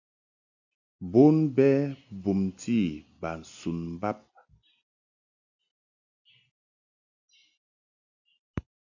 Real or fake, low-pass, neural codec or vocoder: real; 7.2 kHz; none